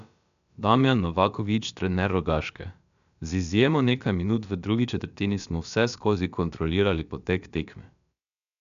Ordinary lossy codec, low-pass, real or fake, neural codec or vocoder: none; 7.2 kHz; fake; codec, 16 kHz, about 1 kbps, DyCAST, with the encoder's durations